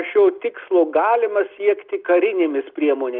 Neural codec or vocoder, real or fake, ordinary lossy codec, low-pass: none; real; Opus, 24 kbps; 5.4 kHz